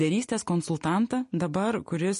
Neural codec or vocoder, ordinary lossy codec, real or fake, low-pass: none; MP3, 48 kbps; real; 10.8 kHz